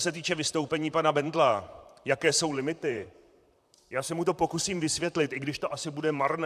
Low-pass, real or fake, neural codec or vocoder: 14.4 kHz; fake; vocoder, 44.1 kHz, 128 mel bands, Pupu-Vocoder